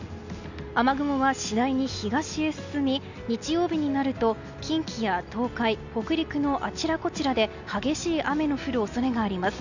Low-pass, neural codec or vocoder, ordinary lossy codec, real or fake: 7.2 kHz; none; none; real